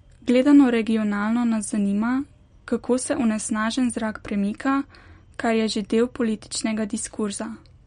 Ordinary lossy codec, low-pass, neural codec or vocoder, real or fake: MP3, 48 kbps; 9.9 kHz; none; real